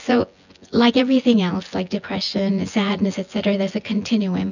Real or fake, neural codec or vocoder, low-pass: fake; vocoder, 24 kHz, 100 mel bands, Vocos; 7.2 kHz